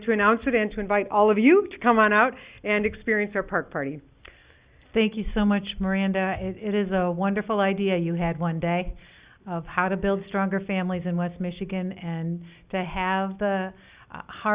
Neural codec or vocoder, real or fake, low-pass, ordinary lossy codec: none; real; 3.6 kHz; Opus, 64 kbps